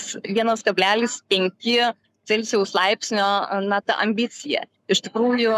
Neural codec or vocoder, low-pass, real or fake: codec, 44.1 kHz, 7.8 kbps, Pupu-Codec; 14.4 kHz; fake